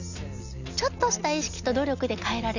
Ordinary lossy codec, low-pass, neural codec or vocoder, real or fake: none; 7.2 kHz; none; real